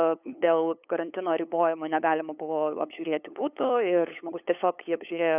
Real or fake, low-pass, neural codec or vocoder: fake; 3.6 kHz; codec, 16 kHz, 8 kbps, FunCodec, trained on LibriTTS, 25 frames a second